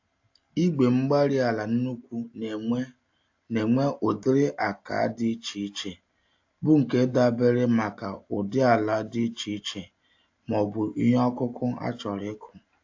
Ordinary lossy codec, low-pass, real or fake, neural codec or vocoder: AAC, 48 kbps; 7.2 kHz; real; none